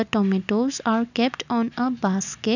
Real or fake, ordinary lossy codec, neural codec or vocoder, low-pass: real; none; none; 7.2 kHz